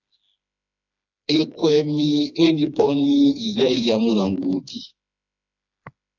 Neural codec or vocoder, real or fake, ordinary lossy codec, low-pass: codec, 16 kHz, 2 kbps, FreqCodec, smaller model; fake; AAC, 48 kbps; 7.2 kHz